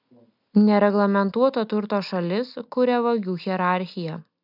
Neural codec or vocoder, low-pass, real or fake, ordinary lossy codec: none; 5.4 kHz; real; AAC, 48 kbps